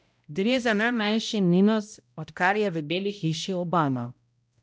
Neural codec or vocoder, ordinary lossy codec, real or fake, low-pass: codec, 16 kHz, 0.5 kbps, X-Codec, HuBERT features, trained on balanced general audio; none; fake; none